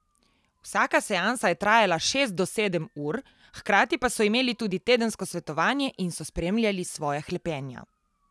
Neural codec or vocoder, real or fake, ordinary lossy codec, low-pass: none; real; none; none